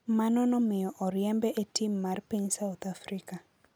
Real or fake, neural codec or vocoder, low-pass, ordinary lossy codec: real; none; none; none